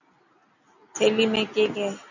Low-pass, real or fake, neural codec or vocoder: 7.2 kHz; real; none